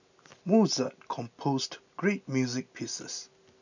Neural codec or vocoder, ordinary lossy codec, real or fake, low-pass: none; none; real; 7.2 kHz